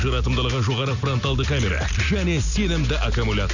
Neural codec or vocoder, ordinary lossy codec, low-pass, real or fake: none; none; 7.2 kHz; real